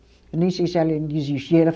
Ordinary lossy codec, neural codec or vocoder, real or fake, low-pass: none; none; real; none